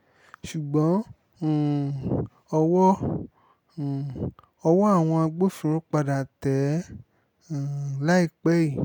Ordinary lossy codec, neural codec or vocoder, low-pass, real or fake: none; none; 19.8 kHz; real